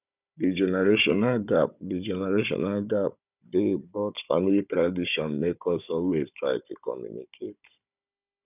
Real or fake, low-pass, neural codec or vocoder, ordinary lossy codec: fake; 3.6 kHz; codec, 16 kHz, 16 kbps, FunCodec, trained on Chinese and English, 50 frames a second; none